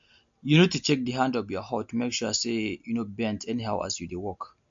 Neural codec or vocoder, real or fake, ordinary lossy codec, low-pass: none; real; MP3, 48 kbps; 7.2 kHz